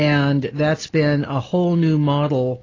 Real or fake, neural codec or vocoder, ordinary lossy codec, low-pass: real; none; AAC, 32 kbps; 7.2 kHz